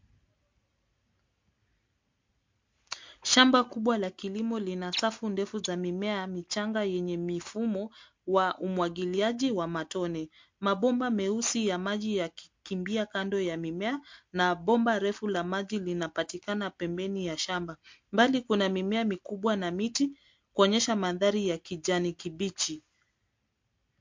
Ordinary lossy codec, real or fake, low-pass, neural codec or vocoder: MP3, 48 kbps; real; 7.2 kHz; none